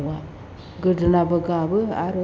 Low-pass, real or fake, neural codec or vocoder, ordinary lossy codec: none; real; none; none